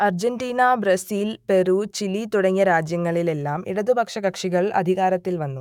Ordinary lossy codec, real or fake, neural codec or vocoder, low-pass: none; fake; autoencoder, 48 kHz, 128 numbers a frame, DAC-VAE, trained on Japanese speech; 19.8 kHz